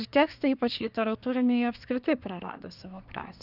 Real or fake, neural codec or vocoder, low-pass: fake; codec, 24 kHz, 1 kbps, SNAC; 5.4 kHz